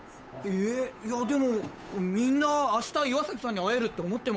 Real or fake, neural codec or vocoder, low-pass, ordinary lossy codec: fake; codec, 16 kHz, 8 kbps, FunCodec, trained on Chinese and English, 25 frames a second; none; none